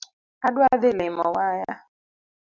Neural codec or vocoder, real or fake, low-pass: none; real; 7.2 kHz